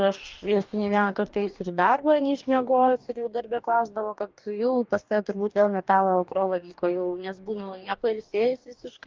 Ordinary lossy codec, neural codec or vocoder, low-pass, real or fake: Opus, 32 kbps; codec, 44.1 kHz, 2.6 kbps, DAC; 7.2 kHz; fake